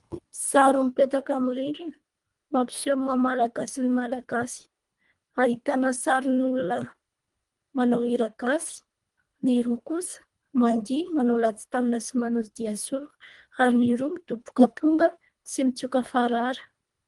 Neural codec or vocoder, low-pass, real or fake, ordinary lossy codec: codec, 24 kHz, 1.5 kbps, HILCodec; 10.8 kHz; fake; Opus, 32 kbps